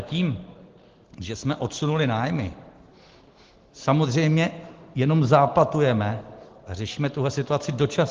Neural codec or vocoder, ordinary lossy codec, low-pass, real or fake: none; Opus, 16 kbps; 7.2 kHz; real